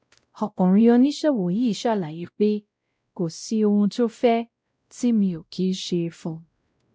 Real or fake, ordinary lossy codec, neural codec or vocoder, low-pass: fake; none; codec, 16 kHz, 0.5 kbps, X-Codec, WavLM features, trained on Multilingual LibriSpeech; none